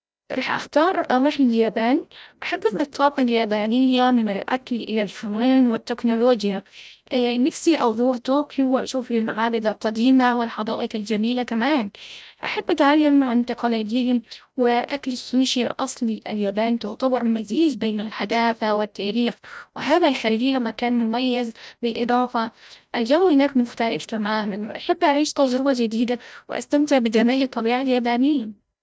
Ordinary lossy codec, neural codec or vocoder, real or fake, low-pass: none; codec, 16 kHz, 0.5 kbps, FreqCodec, larger model; fake; none